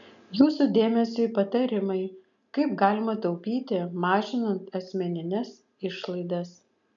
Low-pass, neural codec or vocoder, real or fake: 7.2 kHz; none; real